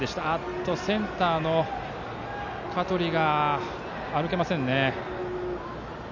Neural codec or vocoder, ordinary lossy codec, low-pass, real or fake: none; none; 7.2 kHz; real